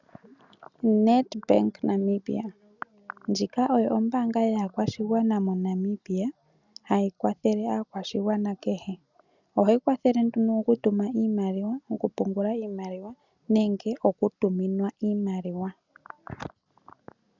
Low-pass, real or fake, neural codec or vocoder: 7.2 kHz; real; none